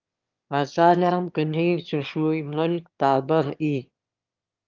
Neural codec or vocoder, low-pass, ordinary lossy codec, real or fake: autoencoder, 22.05 kHz, a latent of 192 numbers a frame, VITS, trained on one speaker; 7.2 kHz; Opus, 24 kbps; fake